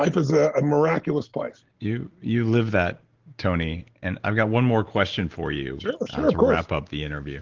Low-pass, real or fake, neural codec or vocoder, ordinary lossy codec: 7.2 kHz; real; none; Opus, 16 kbps